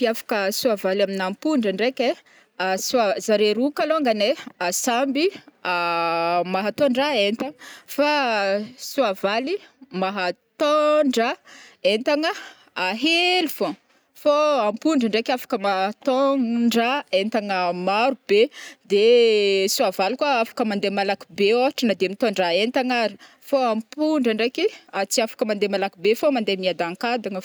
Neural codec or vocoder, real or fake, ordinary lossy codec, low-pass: none; real; none; none